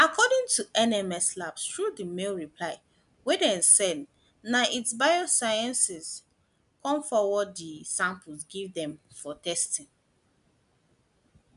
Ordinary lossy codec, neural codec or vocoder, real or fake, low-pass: none; none; real; 10.8 kHz